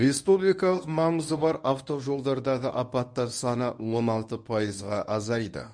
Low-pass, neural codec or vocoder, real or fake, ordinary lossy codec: 9.9 kHz; codec, 24 kHz, 0.9 kbps, WavTokenizer, medium speech release version 1; fake; none